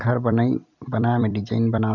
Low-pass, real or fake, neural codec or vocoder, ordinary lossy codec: 7.2 kHz; fake; vocoder, 44.1 kHz, 128 mel bands every 256 samples, BigVGAN v2; none